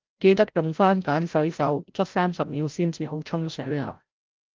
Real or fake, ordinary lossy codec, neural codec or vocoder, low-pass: fake; Opus, 32 kbps; codec, 16 kHz, 0.5 kbps, FreqCodec, larger model; 7.2 kHz